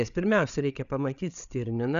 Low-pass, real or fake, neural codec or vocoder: 7.2 kHz; fake; codec, 16 kHz, 8 kbps, FreqCodec, larger model